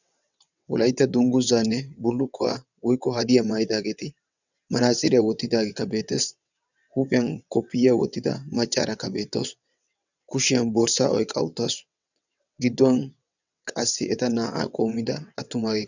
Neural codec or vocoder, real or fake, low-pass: vocoder, 22.05 kHz, 80 mel bands, WaveNeXt; fake; 7.2 kHz